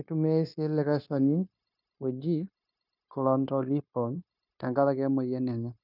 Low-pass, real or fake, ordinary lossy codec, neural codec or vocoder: 5.4 kHz; fake; none; codec, 16 kHz, 0.9 kbps, LongCat-Audio-Codec